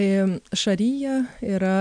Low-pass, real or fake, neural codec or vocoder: 9.9 kHz; real; none